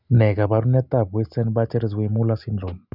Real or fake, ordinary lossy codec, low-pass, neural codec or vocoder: real; none; 5.4 kHz; none